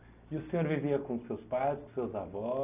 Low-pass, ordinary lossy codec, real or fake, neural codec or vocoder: 3.6 kHz; none; real; none